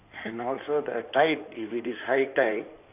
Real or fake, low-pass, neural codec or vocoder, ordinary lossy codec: fake; 3.6 kHz; codec, 16 kHz in and 24 kHz out, 2.2 kbps, FireRedTTS-2 codec; none